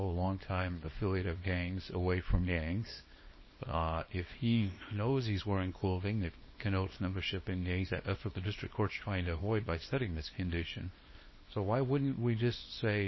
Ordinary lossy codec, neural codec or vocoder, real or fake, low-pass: MP3, 24 kbps; codec, 24 kHz, 0.9 kbps, WavTokenizer, small release; fake; 7.2 kHz